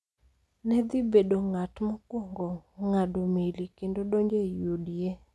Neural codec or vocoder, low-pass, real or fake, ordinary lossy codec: none; none; real; none